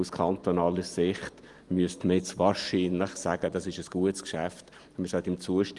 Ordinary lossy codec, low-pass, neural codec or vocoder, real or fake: Opus, 24 kbps; 10.8 kHz; none; real